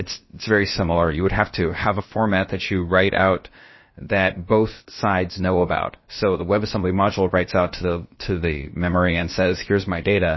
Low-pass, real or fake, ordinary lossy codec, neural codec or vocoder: 7.2 kHz; fake; MP3, 24 kbps; codec, 16 kHz, about 1 kbps, DyCAST, with the encoder's durations